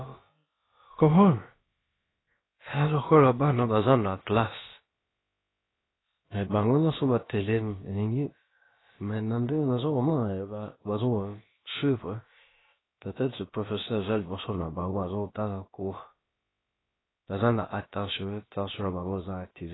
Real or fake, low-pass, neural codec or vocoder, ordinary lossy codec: fake; 7.2 kHz; codec, 16 kHz, about 1 kbps, DyCAST, with the encoder's durations; AAC, 16 kbps